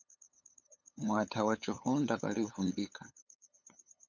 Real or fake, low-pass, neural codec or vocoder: fake; 7.2 kHz; codec, 16 kHz, 8 kbps, FunCodec, trained on LibriTTS, 25 frames a second